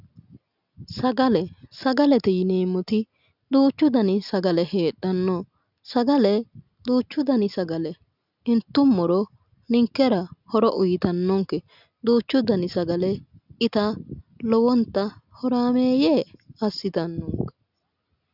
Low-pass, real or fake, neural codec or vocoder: 5.4 kHz; real; none